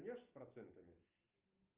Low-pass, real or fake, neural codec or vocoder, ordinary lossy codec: 3.6 kHz; real; none; Opus, 24 kbps